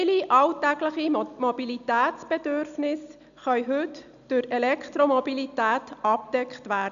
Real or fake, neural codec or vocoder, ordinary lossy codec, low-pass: real; none; none; 7.2 kHz